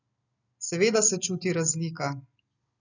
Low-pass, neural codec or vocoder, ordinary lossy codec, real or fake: 7.2 kHz; none; none; real